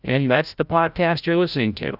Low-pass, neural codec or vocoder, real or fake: 5.4 kHz; codec, 16 kHz, 0.5 kbps, FreqCodec, larger model; fake